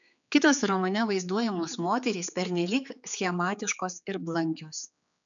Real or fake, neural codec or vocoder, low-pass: fake; codec, 16 kHz, 4 kbps, X-Codec, HuBERT features, trained on general audio; 7.2 kHz